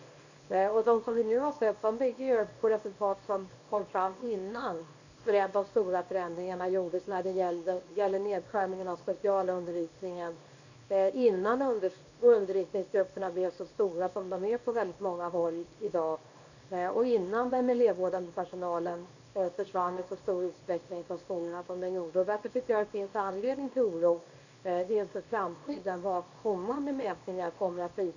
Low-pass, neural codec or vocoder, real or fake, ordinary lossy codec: 7.2 kHz; codec, 24 kHz, 0.9 kbps, WavTokenizer, small release; fake; none